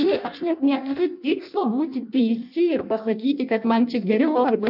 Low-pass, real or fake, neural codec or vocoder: 5.4 kHz; fake; codec, 16 kHz in and 24 kHz out, 0.6 kbps, FireRedTTS-2 codec